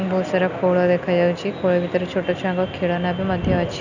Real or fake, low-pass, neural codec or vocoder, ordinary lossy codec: real; 7.2 kHz; none; none